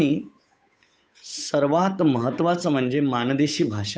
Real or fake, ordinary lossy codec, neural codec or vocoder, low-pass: fake; none; codec, 16 kHz, 8 kbps, FunCodec, trained on Chinese and English, 25 frames a second; none